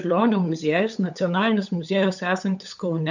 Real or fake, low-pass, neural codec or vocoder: fake; 7.2 kHz; codec, 16 kHz, 8 kbps, FunCodec, trained on LibriTTS, 25 frames a second